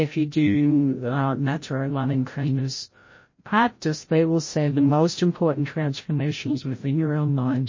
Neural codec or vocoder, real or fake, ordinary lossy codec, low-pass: codec, 16 kHz, 0.5 kbps, FreqCodec, larger model; fake; MP3, 32 kbps; 7.2 kHz